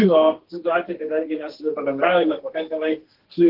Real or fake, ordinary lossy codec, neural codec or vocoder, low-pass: fake; Opus, 16 kbps; codec, 32 kHz, 1.9 kbps, SNAC; 5.4 kHz